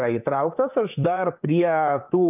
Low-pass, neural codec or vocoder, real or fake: 3.6 kHz; vocoder, 44.1 kHz, 128 mel bands, Pupu-Vocoder; fake